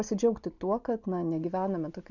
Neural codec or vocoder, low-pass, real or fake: none; 7.2 kHz; real